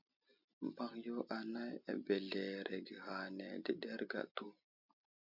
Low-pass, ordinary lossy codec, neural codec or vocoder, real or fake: 5.4 kHz; MP3, 48 kbps; none; real